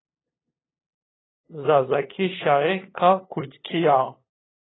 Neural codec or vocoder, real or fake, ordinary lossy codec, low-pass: codec, 16 kHz, 2 kbps, FunCodec, trained on LibriTTS, 25 frames a second; fake; AAC, 16 kbps; 7.2 kHz